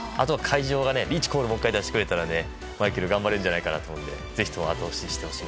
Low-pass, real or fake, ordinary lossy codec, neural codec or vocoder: none; real; none; none